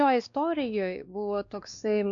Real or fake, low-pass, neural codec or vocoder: fake; 7.2 kHz; codec, 16 kHz, 2 kbps, X-Codec, WavLM features, trained on Multilingual LibriSpeech